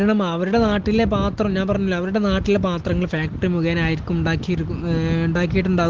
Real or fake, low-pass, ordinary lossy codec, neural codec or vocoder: real; 7.2 kHz; Opus, 16 kbps; none